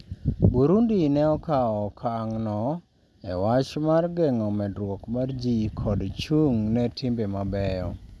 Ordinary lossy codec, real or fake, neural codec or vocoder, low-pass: none; real; none; none